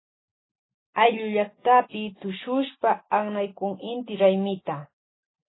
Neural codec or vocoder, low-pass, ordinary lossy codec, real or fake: none; 7.2 kHz; AAC, 16 kbps; real